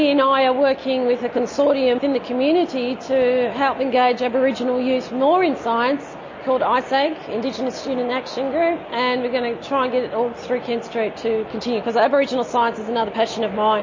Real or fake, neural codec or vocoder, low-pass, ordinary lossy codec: real; none; 7.2 kHz; MP3, 32 kbps